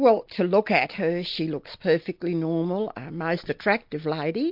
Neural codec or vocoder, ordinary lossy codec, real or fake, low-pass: none; MP3, 48 kbps; real; 5.4 kHz